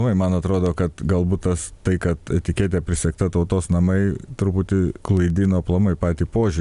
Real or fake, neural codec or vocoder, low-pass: real; none; 10.8 kHz